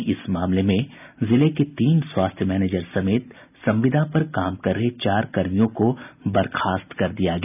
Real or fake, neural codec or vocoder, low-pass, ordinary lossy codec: real; none; 3.6 kHz; none